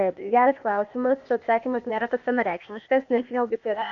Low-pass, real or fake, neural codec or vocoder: 7.2 kHz; fake; codec, 16 kHz, 0.8 kbps, ZipCodec